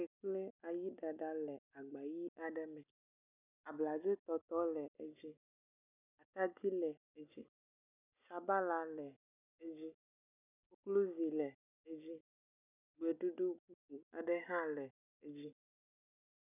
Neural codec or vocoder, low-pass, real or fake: none; 3.6 kHz; real